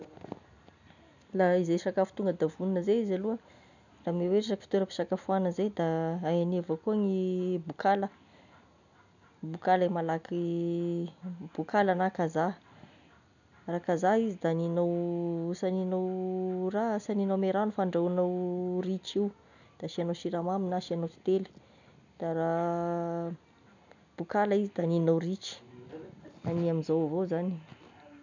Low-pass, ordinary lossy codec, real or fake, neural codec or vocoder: 7.2 kHz; none; real; none